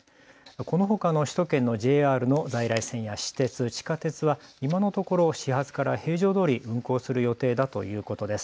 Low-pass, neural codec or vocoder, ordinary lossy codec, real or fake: none; none; none; real